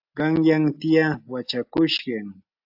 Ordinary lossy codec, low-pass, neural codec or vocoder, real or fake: AAC, 48 kbps; 5.4 kHz; none; real